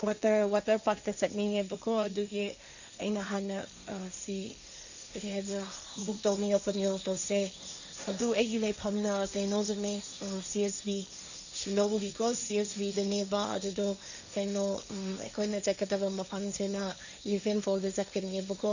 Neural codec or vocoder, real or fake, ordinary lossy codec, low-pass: codec, 16 kHz, 1.1 kbps, Voila-Tokenizer; fake; none; 7.2 kHz